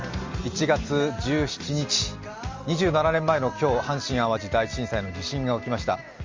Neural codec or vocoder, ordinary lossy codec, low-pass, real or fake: none; Opus, 32 kbps; 7.2 kHz; real